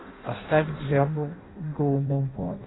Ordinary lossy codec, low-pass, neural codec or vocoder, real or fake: AAC, 16 kbps; 7.2 kHz; codec, 16 kHz in and 24 kHz out, 0.6 kbps, FireRedTTS-2 codec; fake